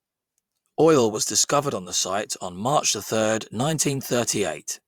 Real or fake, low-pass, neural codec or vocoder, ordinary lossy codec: fake; 19.8 kHz; vocoder, 44.1 kHz, 128 mel bands every 512 samples, BigVGAN v2; Opus, 64 kbps